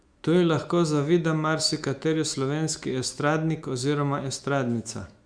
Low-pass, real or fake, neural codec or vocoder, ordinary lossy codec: 9.9 kHz; fake; autoencoder, 48 kHz, 128 numbers a frame, DAC-VAE, trained on Japanese speech; Opus, 64 kbps